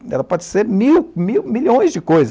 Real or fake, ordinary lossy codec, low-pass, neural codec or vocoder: real; none; none; none